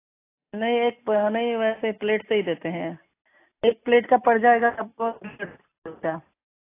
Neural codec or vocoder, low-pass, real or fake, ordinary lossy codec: none; 3.6 kHz; real; AAC, 24 kbps